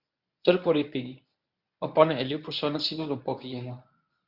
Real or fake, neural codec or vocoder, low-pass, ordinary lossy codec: fake; codec, 24 kHz, 0.9 kbps, WavTokenizer, medium speech release version 1; 5.4 kHz; Opus, 64 kbps